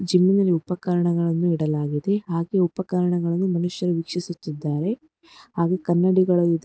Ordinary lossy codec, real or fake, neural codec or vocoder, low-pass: none; real; none; none